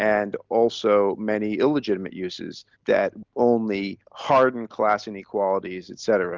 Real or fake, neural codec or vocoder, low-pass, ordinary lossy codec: real; none; 7.2 kHz; Opus, 32 kbps